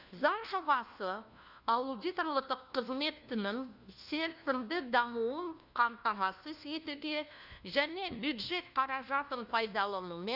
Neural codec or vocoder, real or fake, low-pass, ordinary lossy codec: codec, 16 kHz, 1 kbps, FunCodec, trained on LibriTTS, 50 frames a second; fake; 5.4 kHz; none